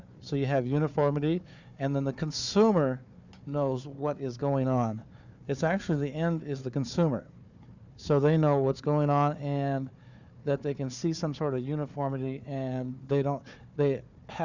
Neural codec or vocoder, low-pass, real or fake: codec, 16 kHz, 4 kbps, FunCodec, trained on Chinese and English, 50 frames a second; 7.2 kHz; fake